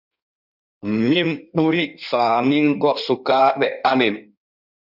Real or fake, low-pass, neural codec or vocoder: fake; 5.4 kHz; codec, 16 kHz in and 24 kHz out, 1.1 kbps, FireRedTTS-2 codec